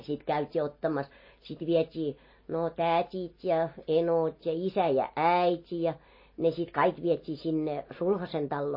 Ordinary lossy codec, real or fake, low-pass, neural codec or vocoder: MP3, 24 kbps; real; 5.4 kHz; none